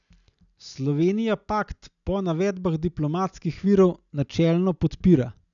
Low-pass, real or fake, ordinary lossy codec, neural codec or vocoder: 7.2 kHz; real; none; none